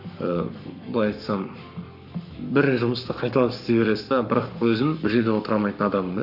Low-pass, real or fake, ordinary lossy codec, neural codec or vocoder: 5.4 kHz; fake; none; codec, 44.1 kHz, 7.8 kbps, Pupu-Codec